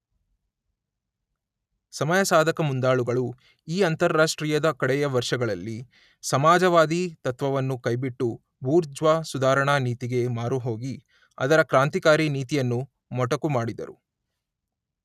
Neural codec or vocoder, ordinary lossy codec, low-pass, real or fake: none; none; 14.4 kHz; real